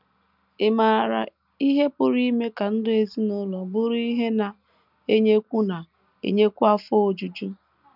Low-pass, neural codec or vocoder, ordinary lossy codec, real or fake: 5.4 kHz; none; none; real